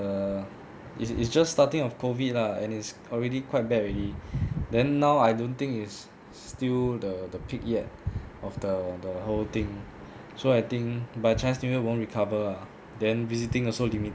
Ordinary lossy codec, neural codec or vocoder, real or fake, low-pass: none; none; real; none